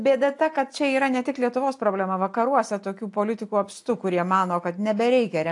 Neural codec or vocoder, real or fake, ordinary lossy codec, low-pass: none; real; AAC, 64 kbps; 10.8 kHz